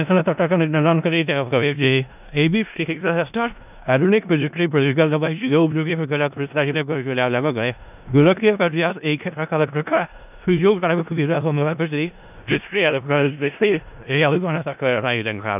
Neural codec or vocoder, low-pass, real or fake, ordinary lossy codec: codec, 16 kHz in and 24 kHz out, 0.4 kbps, LongCat-Audio-Codec, four codebook decoder; 3.6 kHz; fake; none